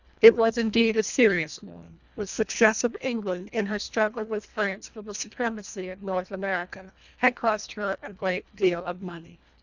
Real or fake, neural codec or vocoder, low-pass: fake; codec, 24 kHz, 1.5 kbps, HILCodec; 7.2 kHz